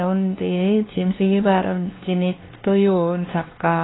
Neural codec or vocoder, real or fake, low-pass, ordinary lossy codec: codec, 16 kHz, 2 kbps, X-Codec, WavLM features, trained on Multilingual LibriSpeech; fake; 7.2 kHz; AAC, 16 kbps